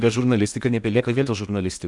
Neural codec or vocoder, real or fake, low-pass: codec, 16 kHz in and 24 kHz out, 0.8 kbps, FocalCodec, streaming, 65536 codes; fake; 10.8 kHz